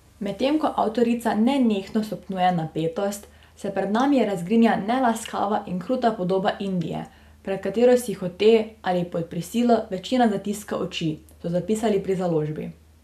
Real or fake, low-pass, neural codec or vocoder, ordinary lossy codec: real; 14.4 kHz; none; none